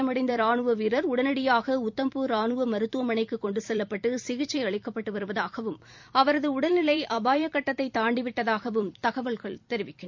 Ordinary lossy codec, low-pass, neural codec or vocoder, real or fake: MP3, 64 kbps; 7.2 kHz; vocoder, 44.1 kHz, 128 mel bands every 256 samples, BigVGAN v2; fake